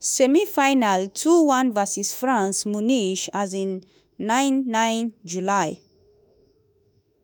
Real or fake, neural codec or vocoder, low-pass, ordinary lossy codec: fake; autoencoder, 48 kHz, 32 numbers a frame, DAC-VAE, trained on Japanese speech; none; none